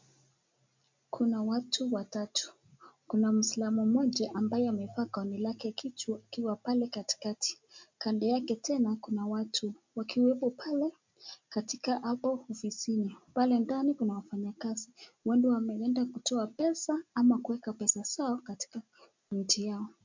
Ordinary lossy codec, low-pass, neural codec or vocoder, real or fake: MP3, 64 kbps; 7.2 kHz; none; real